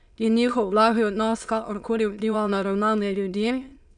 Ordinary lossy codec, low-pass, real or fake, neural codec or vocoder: none; 9.9 kHz; fake; autoencoder, 22.05 kHz, a latent of 192 numbers a frame, VITS, trained on many speakers